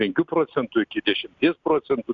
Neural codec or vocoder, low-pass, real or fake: none; 7.2 kHz; real